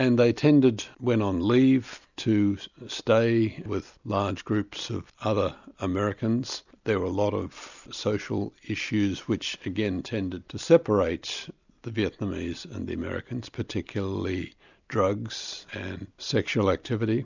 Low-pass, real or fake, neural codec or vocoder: 7.2 kHz; real; none